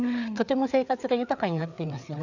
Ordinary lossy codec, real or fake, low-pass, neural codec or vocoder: none; fake; 7.2 kHz; codec, 16 kHz, 4 kbps, FreqCodec, larger model